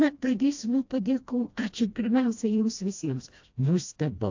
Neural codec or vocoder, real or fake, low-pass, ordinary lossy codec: codec, 16 kHz, 1 kbps, FreqCodec, smaller model; fake; 7.2 kHz; AAC, 48 kbps